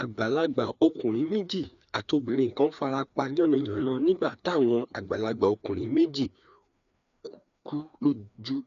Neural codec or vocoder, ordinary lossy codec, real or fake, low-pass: codec, 16 kHz, 2 kbps, FreqCodec, larger model; none; fake; 7.2 kHz